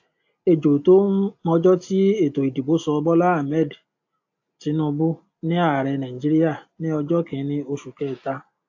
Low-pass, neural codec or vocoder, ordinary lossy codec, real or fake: 7.2 kHz; none; AAC, 48 kbps; real